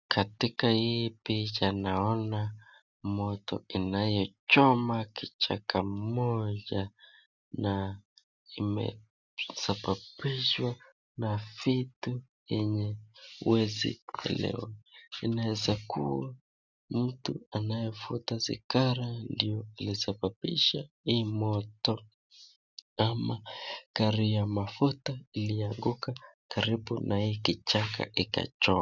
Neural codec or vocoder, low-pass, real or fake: none; 7.2 kHz; real